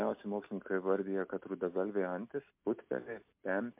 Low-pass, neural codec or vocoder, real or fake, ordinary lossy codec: 3.6 kHz; none; real; MP3, 32 kbps